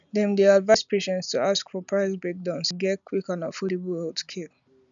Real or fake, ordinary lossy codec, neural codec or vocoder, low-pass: real; none; none; 7.2 kHz